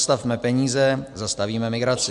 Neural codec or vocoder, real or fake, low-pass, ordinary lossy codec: none; real; 10.8 kHz; Opus, 64 kbps